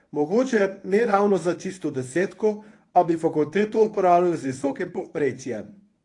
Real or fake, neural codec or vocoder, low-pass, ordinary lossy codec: fake; codec, 24 kHz, 0.9 kbps, WavTokenizer, medium speech release version 1; 10.8 kHz; AAC, 48 kbps